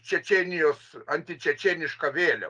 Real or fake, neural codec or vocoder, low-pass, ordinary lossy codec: real; none; 9.9 kHz; Opus, 32 kbps